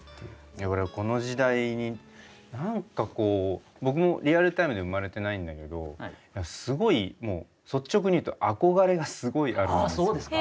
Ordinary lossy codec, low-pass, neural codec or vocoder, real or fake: none; none; none; real